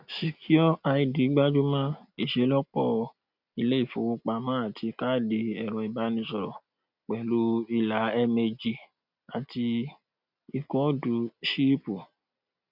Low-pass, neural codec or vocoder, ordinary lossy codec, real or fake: 5.4 kHz; none; none; real